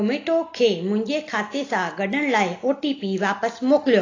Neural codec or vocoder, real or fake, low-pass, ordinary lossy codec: none; real; 7.2 kHz; AAC, 32 kbps